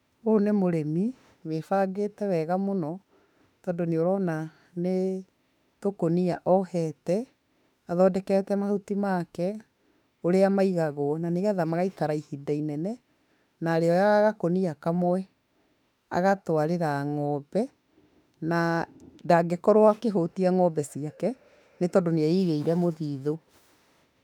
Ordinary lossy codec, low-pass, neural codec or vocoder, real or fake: none; 19.8 kHz; autoencoder, 48 kHz, 32 numbers a frame, DAC-VAE, trained on Japanese speech; fake